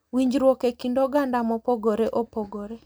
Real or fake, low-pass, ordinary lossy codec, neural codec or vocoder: real; none; none; none